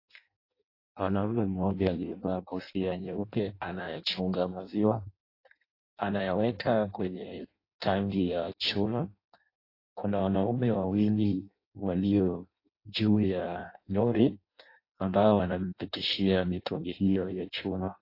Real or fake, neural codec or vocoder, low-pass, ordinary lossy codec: fake; codec, 16 kHz in and 24 kHz out, 0.6 kbps, FireRedTTS-2 codec; 5.4 kHz; AAC, 32 kbps